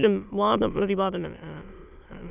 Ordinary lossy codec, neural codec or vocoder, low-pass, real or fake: none; autoencoder, 22.05 kHz, a latent of 192 numbers a frame, VITS, trained on many speakers; 3.6 kHz; fake